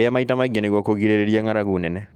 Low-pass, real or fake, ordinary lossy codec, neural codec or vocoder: 19.8 kHz; fake; Opus, 32 kbps; autoencoder, 48 kHz, 128 numbers a frame, DAC-VAE, trained on Japanese speech